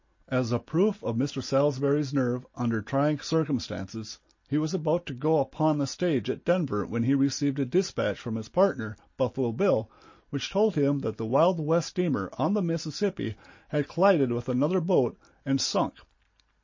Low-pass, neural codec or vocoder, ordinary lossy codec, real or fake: 7.2 kHz; none; MP3, 32 kbps; real